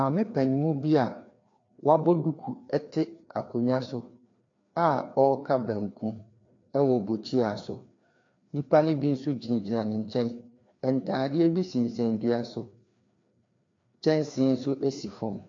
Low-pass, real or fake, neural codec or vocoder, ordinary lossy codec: 7.2 kHz; fake; codec, 16 kHz, 2 kbps, FreqCodec, larger model; AAC, 48 kbps